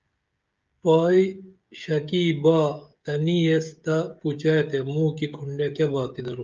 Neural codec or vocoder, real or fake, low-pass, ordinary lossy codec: codec, 16 kHz, 16 kbps, FreqCodec, smaller model; fake; 7.2 kHz; Opus, 24 kbps